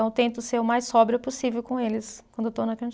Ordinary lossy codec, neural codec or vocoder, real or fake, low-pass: none; none; real; none